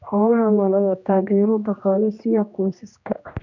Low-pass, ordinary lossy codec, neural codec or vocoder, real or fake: 7.2 kHz; none; codec, 16 kHz, 1 kbps, X-Codec, HuBERT features, trained on general audio; fake